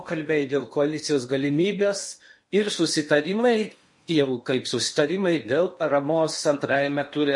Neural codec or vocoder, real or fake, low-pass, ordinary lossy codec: codec, 16 kHz in and 24 kHz out, 0.8 kbps, FocalCodec, streaming, 65536 codes; fake; 10.8 kHz; MP3, 48 kbps